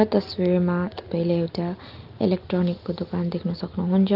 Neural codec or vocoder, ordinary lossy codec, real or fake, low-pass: none; Opus, 32 kbps; real; 5.4 kHz